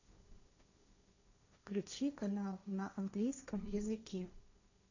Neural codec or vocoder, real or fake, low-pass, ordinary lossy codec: codec, 16 kHz, 1.1 kbps, Voila-Tokenizer; fake; 7.2 kHz; none